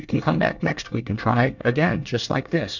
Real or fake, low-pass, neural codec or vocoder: fake; 7.2 kHz; codec, 24 kHz, 1 kbps, SNAC